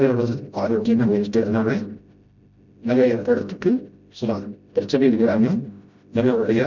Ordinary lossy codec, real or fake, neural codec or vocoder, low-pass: none; fake; codec, 16 kHz, 0.5 kbps, FreqCodec, smaller model; 7.2 kHz